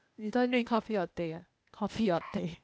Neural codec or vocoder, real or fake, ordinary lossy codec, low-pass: codec, 16 kHz, 0.8 kbps, ZipCodec; fake; none; none